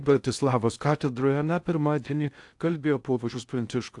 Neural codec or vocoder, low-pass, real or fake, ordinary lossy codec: codec, 16 kHz in and 24 kHz out, 0.6 kbps, FocalCodec, streaming, 2048 codes; 10.8 kHz; fake; AAC, 64 kbps